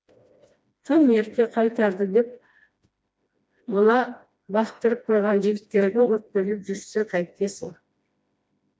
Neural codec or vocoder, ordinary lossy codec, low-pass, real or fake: codec, 16 kHz, 1 kbps, FreqCodec, smaller model; none; none; fake